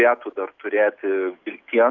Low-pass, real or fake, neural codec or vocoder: 7.2 kHz; real; none